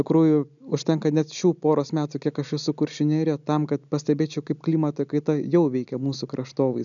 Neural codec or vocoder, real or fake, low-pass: none; real; 7.2 kHz